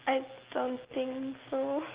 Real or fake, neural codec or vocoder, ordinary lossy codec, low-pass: real; none; Opus, 16 kbps; 3.6 kHz